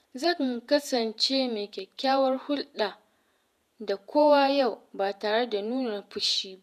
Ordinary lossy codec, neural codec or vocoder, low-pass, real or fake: none; vocoder, 48 kHz, 128 mel bands, Vocos; 14.4 kHz; fake